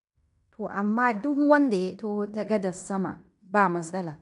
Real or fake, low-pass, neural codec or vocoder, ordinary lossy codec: fake; 10.8 kHz; codec, 16 kHz in and 24 kHz out, 0.9 kbps, LongCat-Audio-Codec, fine tuned four codebook decoder; none